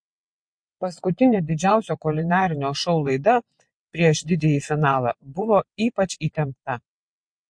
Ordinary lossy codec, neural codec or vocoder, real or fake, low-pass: MP3, 48 kbps; vocoder, 22.05 kHz, 80 mel bands, WaveNeXt; fake; 9.9 kHz